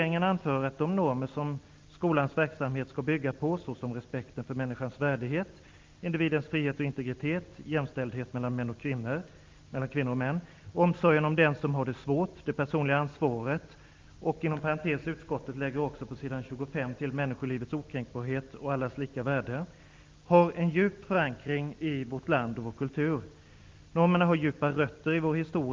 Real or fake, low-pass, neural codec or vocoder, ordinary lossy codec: real; 7.2 kHz; none; Opus, 32 kbps